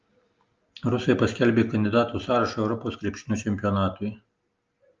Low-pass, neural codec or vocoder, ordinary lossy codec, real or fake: 7.2 kHz; none; Opus, 24 kbps; real